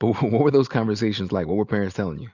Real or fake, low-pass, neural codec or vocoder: real; 7.2 kHz; none